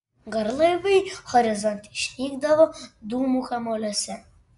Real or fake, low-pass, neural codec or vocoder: real; 10.8 kHz; none